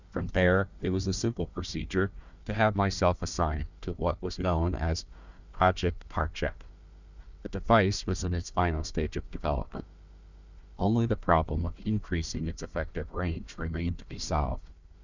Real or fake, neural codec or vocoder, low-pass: fake; codec, 16 kHz, 1 kbps, FunCodec, trained on Chinese and English, 50 frames a second; 7.2 kHz